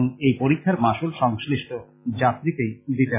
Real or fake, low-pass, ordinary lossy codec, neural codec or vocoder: real; 3.6 kHz; MP3, 16 kbps; none